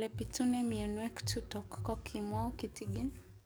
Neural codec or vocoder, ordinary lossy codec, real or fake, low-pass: codec, 44.1 kHz, 7.8 kbps, DAC; none; fake; none